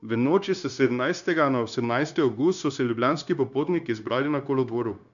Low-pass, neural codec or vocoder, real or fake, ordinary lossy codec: 7.2 kHz; codec, 16 kHz, 0.9 kbps, LongCat-Audio-Codec; fake; none